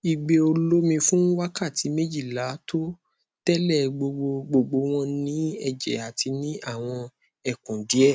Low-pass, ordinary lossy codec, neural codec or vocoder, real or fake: none; none; none; real